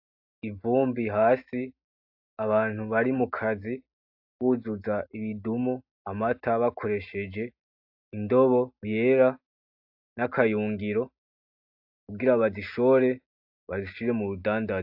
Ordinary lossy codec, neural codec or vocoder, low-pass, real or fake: AAC, 48 kbps; none; 5.4 kHz; real